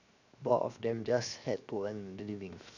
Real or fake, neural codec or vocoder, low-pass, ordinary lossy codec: fake; codec, 16 kHz, 0.7 kbps, FocalCodec; 7.2 kHz; none